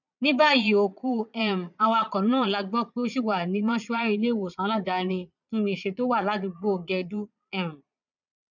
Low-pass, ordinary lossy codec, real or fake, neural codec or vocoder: 7.2 kHz; none; fake; vocoder, 22.05 kHz, 80 mel bands, Vocos